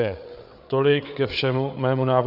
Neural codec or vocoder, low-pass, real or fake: codec, 16 kHz, 8 kbps, FreqCodec, larger model; 5.4 kHz; fake